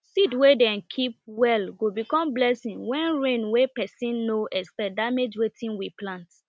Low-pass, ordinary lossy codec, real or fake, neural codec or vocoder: none; none; real; none